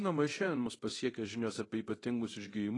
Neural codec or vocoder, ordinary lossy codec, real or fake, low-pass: codec, 24 kHz, 0.9 kbps, DualCodec; AAC, 32 kbps; fake; 10.8 kHz